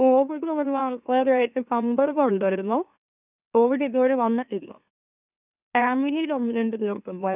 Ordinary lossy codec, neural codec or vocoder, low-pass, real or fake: none; autoencoder, 44.1 kHz, a latent of 192 numbers a frame, MeloTTS; 3.6 kHz; fake